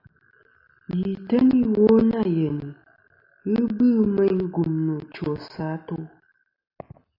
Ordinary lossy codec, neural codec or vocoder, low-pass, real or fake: AAC, 32 kbps; none; 5.4 kHz; real